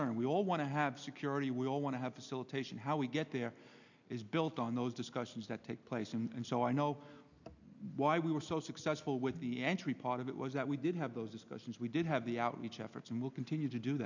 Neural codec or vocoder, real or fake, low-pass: none; real; 7.2 kHz